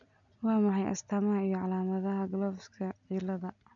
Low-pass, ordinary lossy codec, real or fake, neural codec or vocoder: 7.2 kHz; none; real; none